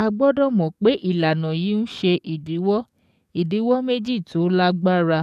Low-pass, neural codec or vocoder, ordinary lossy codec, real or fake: 14.4 kHz; codec, 44.1 kHz, 7.8 kbps, DAC; none; fake